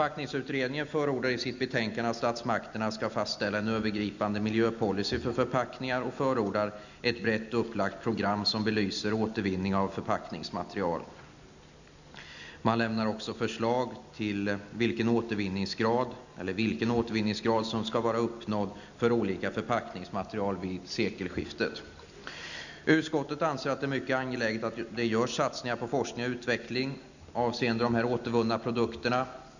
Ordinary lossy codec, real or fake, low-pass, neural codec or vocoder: none; real; 7.2 kHz; none